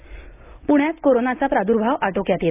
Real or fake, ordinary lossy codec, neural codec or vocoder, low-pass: real; AAC, 24 kbps; none; 3.6 kHz